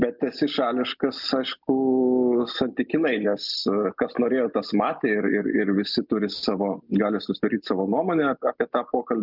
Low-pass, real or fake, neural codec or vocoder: 5.4 kHz; real; none